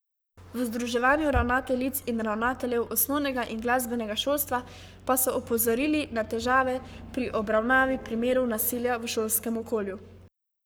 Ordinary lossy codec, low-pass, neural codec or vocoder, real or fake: none; none; codec, 44.1 kHz, 7.8 kbps, Pupu-Codec; fake